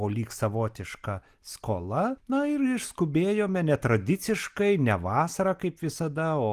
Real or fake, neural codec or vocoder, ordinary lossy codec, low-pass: real; none; Opus, 24 kbps; 14.4 kHz